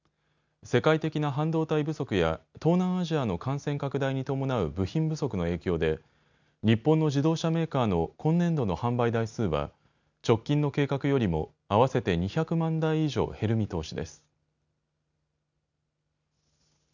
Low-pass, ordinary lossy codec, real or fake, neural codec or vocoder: 7.2 kHz; none; real; none